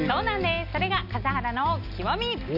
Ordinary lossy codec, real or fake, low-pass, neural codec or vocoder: Opus, 64 kbps; real; 5.4 kHz; none